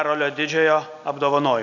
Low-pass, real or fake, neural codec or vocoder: 7.2 kHz; real; none